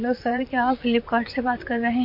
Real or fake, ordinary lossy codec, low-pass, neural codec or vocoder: fake; MP3, 32 kbps; 5.4 kHz; vocoder, 44.1 kHz, 128 mel bands, Pupu-Vocoder